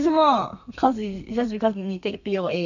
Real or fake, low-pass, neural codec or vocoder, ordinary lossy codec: fake; 7.2 kHz; codec, 32 kHz, 1.9 kbps, SNAC; none